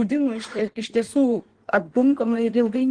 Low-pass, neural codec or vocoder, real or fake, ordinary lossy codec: 9.9 kHz; codec, 16 kHz in and 24 kHz out, 1.1 kbps, FireRedTTS-2 codec; fake; Opus, 16 kbps